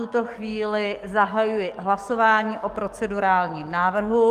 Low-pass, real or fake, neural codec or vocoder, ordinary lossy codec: 14.4 kHz; fake; codec, 44.1 kHz, 7.8 kbps, DAC; Opus, 32 kbps